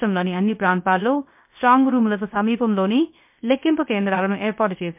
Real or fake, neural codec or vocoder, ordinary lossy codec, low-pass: fake; codec, 16 kHz, 0.3 kbps, FocalCodec; MP3, 32 kbps; 3.6 kHz